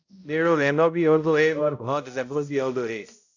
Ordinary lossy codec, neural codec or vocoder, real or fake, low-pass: AAC, 48 kbps; codec, 16 kHz, 0.5 kbps, X-Codec, HuBERT features, trained on balanced general audio; fake; 7.2 kHz